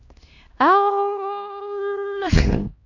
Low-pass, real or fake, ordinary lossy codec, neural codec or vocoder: 7.2 kHz; fake; AAC, 48 kbps; codec, 16 kHz, 1 kbps, X-Codec, HuBERT features, trained on LibriSpeech